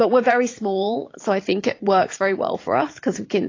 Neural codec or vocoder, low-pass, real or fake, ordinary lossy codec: vocoder, 44.1 kHz, 80 mel bands, Vocos; 7.2 kHz; fake; AAC, 32 kbps